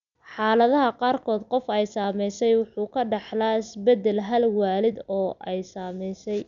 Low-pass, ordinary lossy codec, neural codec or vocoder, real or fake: 7.2 kHz; none; none; real